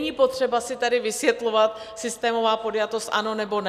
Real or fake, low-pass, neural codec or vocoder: real; 14.4 kHz; none